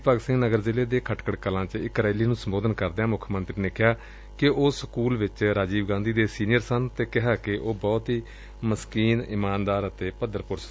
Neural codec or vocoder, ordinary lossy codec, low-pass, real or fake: none; none; none; real